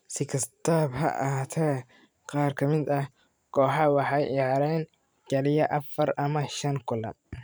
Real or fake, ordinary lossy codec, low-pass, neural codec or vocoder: fake; none; none; vocoder, 44.1 kHz, 128 mel bands every 512 samples, BigVGAN v2